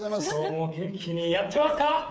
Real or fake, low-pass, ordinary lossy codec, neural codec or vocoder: fake; none; none; codec, 16 kHz, 8 kbps, FreqCodec, smaller model